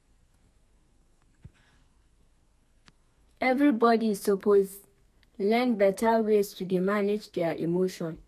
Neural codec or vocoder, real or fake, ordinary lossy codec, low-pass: codec, 44.1 kHz, 2.6 kbps, SNAC; fake; none; 14.4 kHz